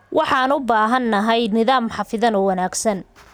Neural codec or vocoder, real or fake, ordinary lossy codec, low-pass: none; real; none; none